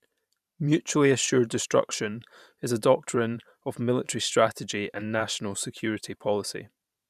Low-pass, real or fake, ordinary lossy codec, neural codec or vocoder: 14.4 kHz; fake; none; vocoder, 44.1 kHz, 128 mel bands every 512 samples, BigVGAN v2